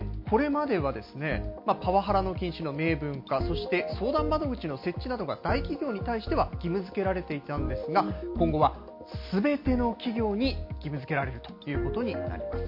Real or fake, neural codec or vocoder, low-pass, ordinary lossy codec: real; none; 5.4 kHz; MP3, 32 kbps